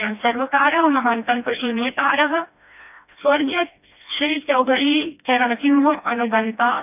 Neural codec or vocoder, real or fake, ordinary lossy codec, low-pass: codec, 16 kHz, 1 kbps, FreqCodec, smaller model; fake; none; 3.6 kHz